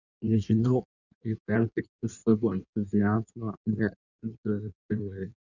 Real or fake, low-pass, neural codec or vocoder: fake; 7.2 kHz; codec, 16 kHz in and 24 kHz out, 1.1 kbps, FireRedTTS-2 codec